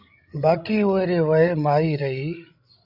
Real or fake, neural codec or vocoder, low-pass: fake; codec, 16 kHz, 16 kbps, FreqCodec, larger model; 5.4 kHz